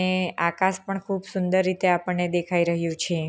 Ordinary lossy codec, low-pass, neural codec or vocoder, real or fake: none; none; none; real